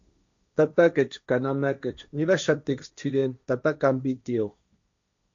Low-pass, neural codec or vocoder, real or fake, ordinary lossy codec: 7.2 kHz; codec, 16 kHz, 1.1 kbps, Voila-Tokenizer; fake; MP3, 64 kbps